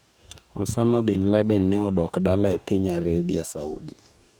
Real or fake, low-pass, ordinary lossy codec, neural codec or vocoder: fake; none; none; codec, 44.1 kHz, 2.6 kbps, DAC